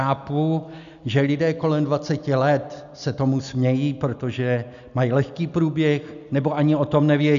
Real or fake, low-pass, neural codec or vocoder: real; 7.2 kHz; none